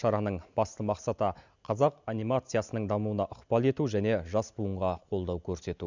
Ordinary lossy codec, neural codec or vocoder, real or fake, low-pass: none; none; real; 7.2 kHz